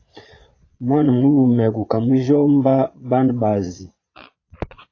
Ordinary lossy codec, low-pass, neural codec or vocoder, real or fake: AAC, 32 kbps; 7.2 kHz; vocoder, 22.05 kHz, 80 mel bands, Vocos; fake